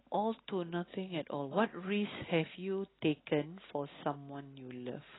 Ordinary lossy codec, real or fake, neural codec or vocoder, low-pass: AAC, 16 kbps; real; none; 7.2 kHz